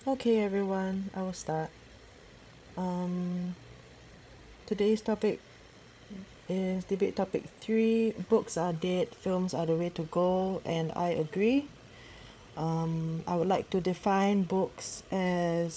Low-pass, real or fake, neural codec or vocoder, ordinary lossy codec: none; fake; codec, 16 kHz, 8 kbps, FreqCodec, larger model; none